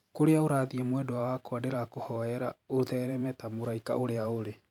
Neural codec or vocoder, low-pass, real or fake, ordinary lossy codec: vocoder, 44.1 kHz, 128 mel bands every 512 samples, BigVGAN v2; 19.8 kHz; fake; none